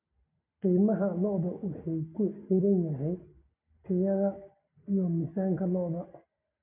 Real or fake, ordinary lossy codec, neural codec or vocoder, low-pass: real; none; none; 3.6 kHz